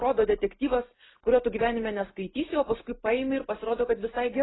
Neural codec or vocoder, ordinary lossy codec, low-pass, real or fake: none; AAC, 16 kbps; 7.2 kHz; real